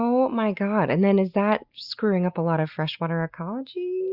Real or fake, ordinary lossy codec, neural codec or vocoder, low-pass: real; Opus, 64 kbps; none; 5.4 kHz